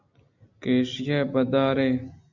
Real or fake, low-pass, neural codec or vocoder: real; 7.2 kHz; none